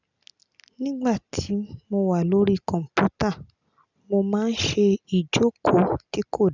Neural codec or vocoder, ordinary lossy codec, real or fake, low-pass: none; none; real; 7.2 kHz